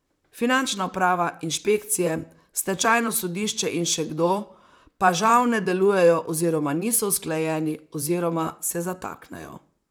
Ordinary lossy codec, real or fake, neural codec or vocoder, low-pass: none; fake; vocoder, 44.1 kHz, 128 mel bands, Pupu-Vocoder; none